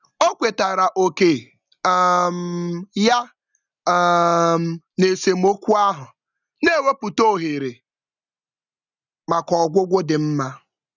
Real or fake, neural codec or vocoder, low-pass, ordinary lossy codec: real; none; 7.2 kHz; none